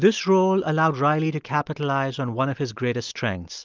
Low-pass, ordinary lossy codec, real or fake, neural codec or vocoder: 7.2 kHz; Opus, 24 kbps; real; none